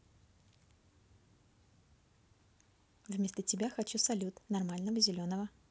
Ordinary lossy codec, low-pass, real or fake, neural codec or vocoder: none; none; real; none